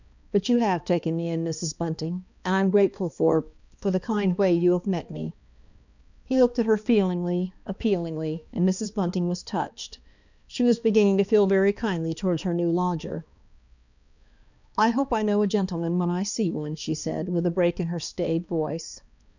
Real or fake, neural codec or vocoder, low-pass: fake; codec, 16 kHz, 2 kbps, X-Codec, HuBERT features, trained on balanced general audio; 7.2 kHz